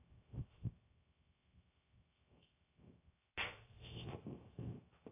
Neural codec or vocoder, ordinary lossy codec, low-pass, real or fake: codec, 16 kHz, 0.3 kbps, FocalCodec; AAC, 24 kbps; 3.6 kHz; fake